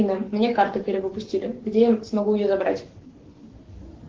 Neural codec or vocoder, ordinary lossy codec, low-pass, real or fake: codec, 44.1 kHz, 7.8 kbps, Pupu-Codec; Opus, 16 kbps; 7.2 kHz; fake